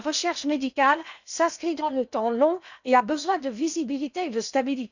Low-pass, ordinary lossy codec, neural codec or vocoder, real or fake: 7.2 kHz; none; codec, 16 kHz in and 24 kHz out, 0.8 kbps, FocalCodec, streaming, 65536 codes; fake